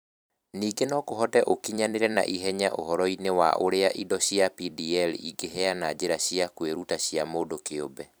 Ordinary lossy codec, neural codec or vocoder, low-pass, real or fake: none; vocoder, 44.1 kHz, 128 mel bands every 256 samples, BigVGAN v2; none; fake